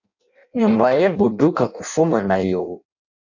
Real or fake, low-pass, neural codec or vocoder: fake; 7.2 kHz; codec, 16 kHz in and 24 kHz out, 0.6 kbps, FireRedTTS-2 codec